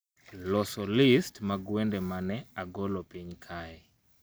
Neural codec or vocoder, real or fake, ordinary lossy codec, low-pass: none; real; none; none